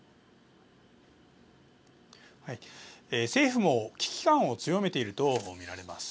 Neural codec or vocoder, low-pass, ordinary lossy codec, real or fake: none; none; none; real